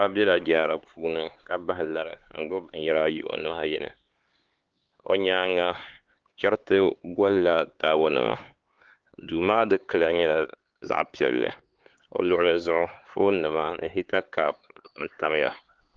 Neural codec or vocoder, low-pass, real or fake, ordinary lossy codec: codec, 16 kHz, 4 kbps, X-Codec, HuBERT features, trained on LibriSpeech; 7.2 kHz; fake; Opus, 16 kbps